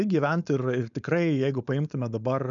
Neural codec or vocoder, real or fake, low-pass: codec, 16 kHz, 4.8 kbps, FACodec; fake; 7.2 kHz